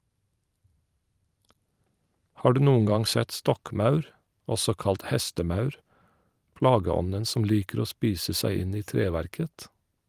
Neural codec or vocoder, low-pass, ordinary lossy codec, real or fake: vocoder, 44.1 kHz, 128 mel bands every 512 samples, BigVGAN v2; 14.4 kHz; Opus, 32 kbps; fake